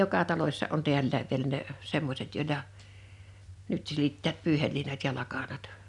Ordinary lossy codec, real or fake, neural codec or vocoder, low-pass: none; real; none; 10.8 kHz